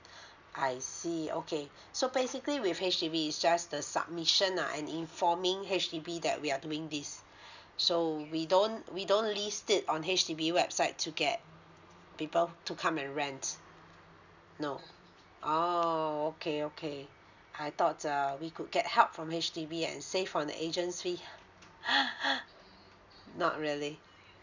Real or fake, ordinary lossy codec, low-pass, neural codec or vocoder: real; none; 7.2 kHz; none